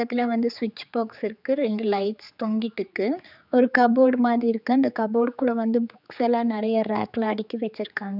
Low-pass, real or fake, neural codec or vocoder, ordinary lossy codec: 5.4 kHz; fake; codec, 16 kHz, 4 kbps, X-Codec, HuBERT features, trained on general audio; none